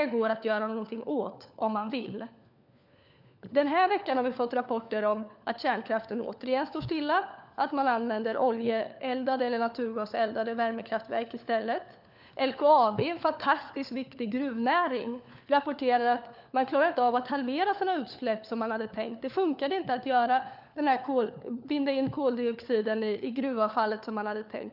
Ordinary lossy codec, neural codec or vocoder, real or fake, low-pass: none; codec, 16 kHz, 4 kbps, FunCodec, trained on LibriTTS, 50 frames a second; fake; 5.4 kHz